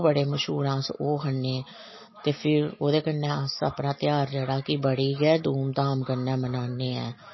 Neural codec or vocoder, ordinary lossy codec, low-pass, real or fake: none; MP3, 24 kbps; 7.2 kHz; real